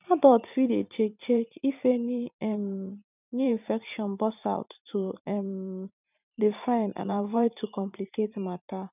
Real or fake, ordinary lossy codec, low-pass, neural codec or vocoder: fake; none; 3.6 kHz; vocoder, 44.1 kHz, 128 mel bands every 512 samples, BigVGAN v2